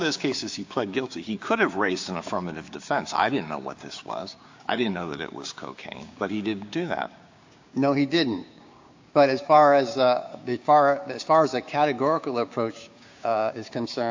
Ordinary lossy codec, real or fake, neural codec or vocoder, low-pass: AAC, 48 kbps; fake; codec, 16 kHz, 6 kbps, DAC; 7.2 kHz